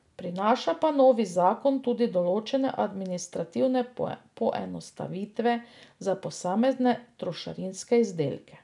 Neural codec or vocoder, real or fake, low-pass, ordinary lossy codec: none; real; 10.8 kHz; none